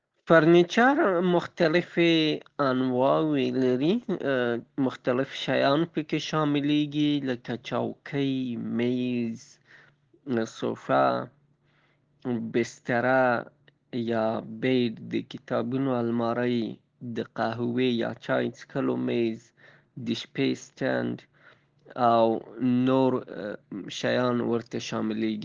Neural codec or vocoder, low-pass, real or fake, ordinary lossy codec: none; 7.2 kHz; real; Opus, 16 kbps